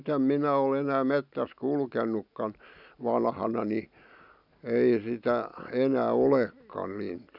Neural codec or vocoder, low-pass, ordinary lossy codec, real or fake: none; 5.4 kHz; none; real